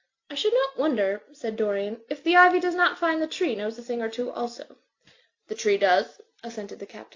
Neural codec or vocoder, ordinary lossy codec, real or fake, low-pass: none; AAC, 48 kbps; real; 7.2 kHz